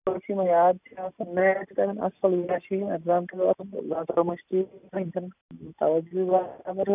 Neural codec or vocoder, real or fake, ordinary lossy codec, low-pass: none; real; none; 3.6 kHz